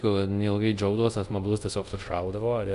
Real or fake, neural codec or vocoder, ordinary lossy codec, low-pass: fake; codec, 24 kHz, 0.5 kbps, DualCodec; MP3, 96 kbps; 10.8 kHz